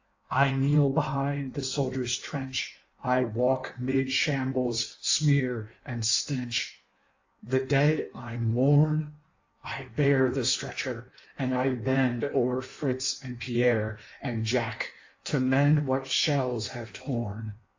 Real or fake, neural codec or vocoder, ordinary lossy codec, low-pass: fake; codec, 16 kHz in and 24 kHz out, 1.1 kbps, FireRedTTS-2 codec; AAC, 48 kbps; 7.2 kHz